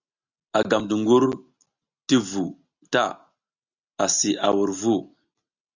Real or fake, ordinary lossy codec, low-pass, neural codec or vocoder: real; Opus, 64 kbps; 7.2 kHz; none